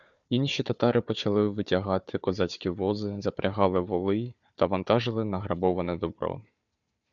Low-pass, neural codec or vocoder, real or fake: 7.2 kHz; codec, 16 kHz, 4 kbps, FunCodec, trained on Chinese and English, 50 frames a second; fake